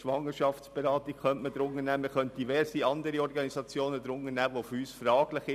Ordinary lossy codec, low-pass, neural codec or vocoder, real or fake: none; 14.4 kHz; none; real